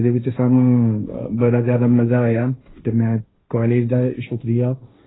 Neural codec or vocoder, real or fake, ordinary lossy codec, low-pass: codec, 16 kHz, 1.1 kbps, Voila-Tokenizer; fake; AAC, 16 kbps; 7.2 kHz